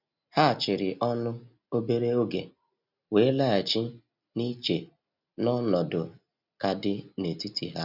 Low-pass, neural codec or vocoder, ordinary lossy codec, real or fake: 5.4 kHz; none; none; real